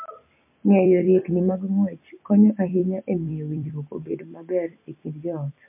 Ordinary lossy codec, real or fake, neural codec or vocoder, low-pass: MP3, 24 kbps; fake; vocoder, 44.1 kHz, 128 mel bands every 256 samples, BigVGAN v2; 3.6 kHz